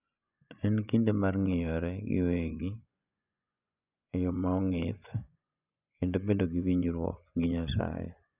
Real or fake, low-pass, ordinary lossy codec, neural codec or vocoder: fake; 3.6 kHz; none; vocoder, 24 kHz, 100 mel bands, Vocos